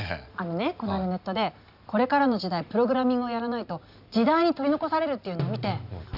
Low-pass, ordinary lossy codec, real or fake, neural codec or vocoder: 5.4 kHz; none; real; none